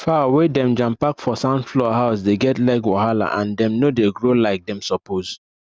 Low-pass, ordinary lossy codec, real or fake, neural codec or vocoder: none; none; real; none